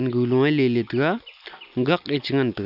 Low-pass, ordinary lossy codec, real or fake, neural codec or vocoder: 5.4 kHz; none; real; none